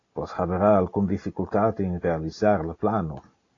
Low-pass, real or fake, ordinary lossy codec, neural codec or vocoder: 7.2 kHz; real; AAC, 32 kbps; none